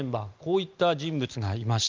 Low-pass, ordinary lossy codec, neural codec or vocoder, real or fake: 7.2 kHz; Opus, 24 kbps; none; real